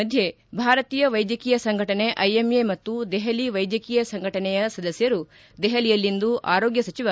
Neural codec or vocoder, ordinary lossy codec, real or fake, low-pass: none; none; real; none